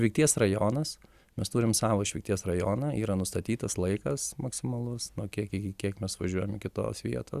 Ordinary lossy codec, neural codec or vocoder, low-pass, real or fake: Opus, 64 kbps; none; 14.4 kHz; real